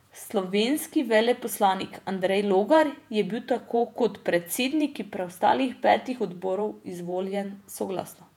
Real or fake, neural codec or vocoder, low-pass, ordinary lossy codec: fake; vocoder, 48 kHz, 128 mel bands, Vocos; 19.8 kHz; none